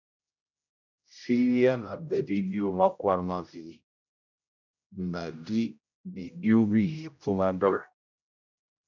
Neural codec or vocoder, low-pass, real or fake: codec, 16 kHz, 0.5 kbps, X-Codec, HuBERT features, trained on general audio; 7.2 kHz; fake